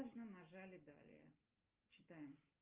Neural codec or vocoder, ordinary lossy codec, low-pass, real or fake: none; Opus, 32 kbps; 3.6 kHz; real